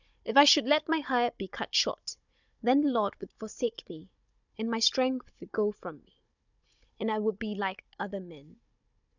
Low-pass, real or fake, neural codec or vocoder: 7.2 kHz; fake; codec, 16 kHz, 16 kbps, FunCodec, trained on Chinese and English, 50 frames a second